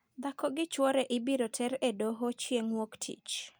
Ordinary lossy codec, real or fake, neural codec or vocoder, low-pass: none; real; none; none